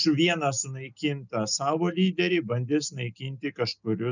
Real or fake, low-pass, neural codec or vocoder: real; 7.2 kHz; none